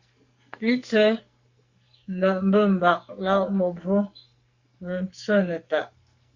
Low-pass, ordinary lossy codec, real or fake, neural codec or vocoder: 7.2 kHz; Opus, 64 kbps; fake; codec, 44.1 kHz, 2.6 kbps, SNAC